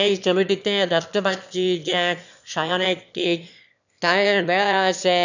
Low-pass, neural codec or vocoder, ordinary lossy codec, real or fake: 7.2 kHz; autoencoder, 22.05 kHz, a latent of 192 numbers a frame, VITS, trained on one speaker; none; fake